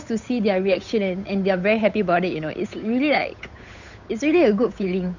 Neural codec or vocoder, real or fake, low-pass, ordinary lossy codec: codec, 16 kHz, 8 kbps, FunCodec, trained on Chinese and English, 25 frames a second; fake; 7.2 kHz; none